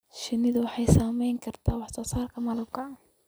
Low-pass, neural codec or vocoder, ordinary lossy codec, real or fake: none; none; none; real